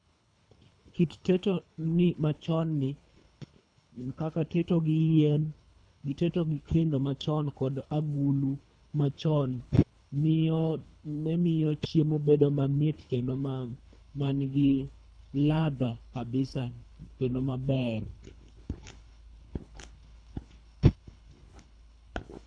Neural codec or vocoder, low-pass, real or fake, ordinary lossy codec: codec, 24 kHz, 3 kbps, HILCodec; 9.9 kHz; fake; none